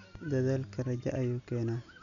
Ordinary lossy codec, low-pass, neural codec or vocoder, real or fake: none; 7.2 kHz; none; real